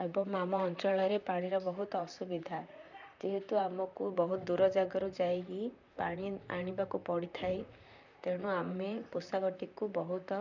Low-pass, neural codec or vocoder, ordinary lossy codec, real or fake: 7.2 kHz; vocoder, 44.1 kHz, 128 mel bands, Pupu-Vocoder; none; fake